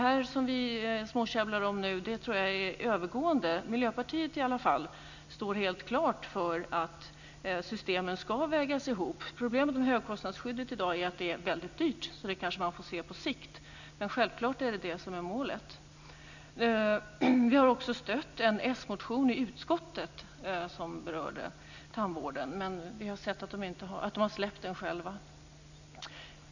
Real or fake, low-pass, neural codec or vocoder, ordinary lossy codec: real; 7.2 kHz; none; none